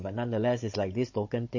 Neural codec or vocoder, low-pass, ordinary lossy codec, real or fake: codec, 16 kHz, 16 kbps, FunCodec, trained on Chinese and English, 50 frames a second; 7.2 kHz; MP3, 32 kbps; fake